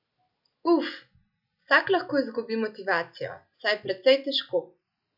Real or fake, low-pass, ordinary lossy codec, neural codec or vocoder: real; 5.4 kHz; none; none